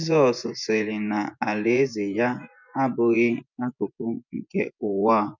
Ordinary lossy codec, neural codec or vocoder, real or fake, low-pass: none; vocoder, 24 kHz, 100 mel bands, Vocos; fake; 7.2 kHz